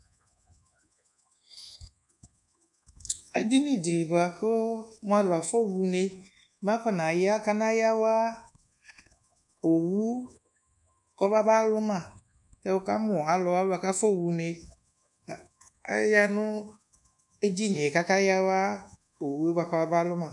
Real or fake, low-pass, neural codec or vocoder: fake; 10.8 kHz; codec, 24 kHz, 1.2 kbps, DualCodec